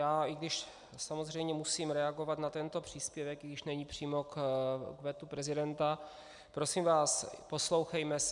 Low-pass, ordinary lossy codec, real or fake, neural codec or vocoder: 10.8 kHz; MP3, 96 kbps; real; none